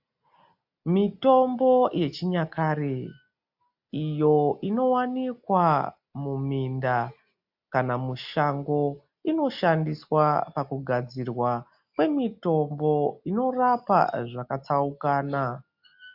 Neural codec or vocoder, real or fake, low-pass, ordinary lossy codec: none; real; 5.4 kHz; AAC, 48 kbps